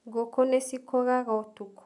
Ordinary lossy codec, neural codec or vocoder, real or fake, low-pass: none; none; real; 10.8 kHz